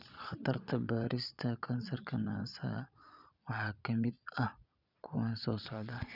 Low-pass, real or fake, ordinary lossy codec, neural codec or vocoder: 5.4 kHz; fake; none; vocoder, 22.05 kHz, 80 mel bands, Vocos